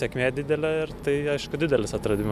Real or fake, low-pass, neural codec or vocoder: real; 14.4 kHz; none